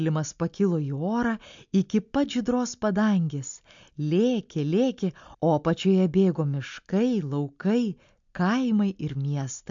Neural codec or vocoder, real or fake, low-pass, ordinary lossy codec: none; real; 7.2 kHz; MP3, 64 kbps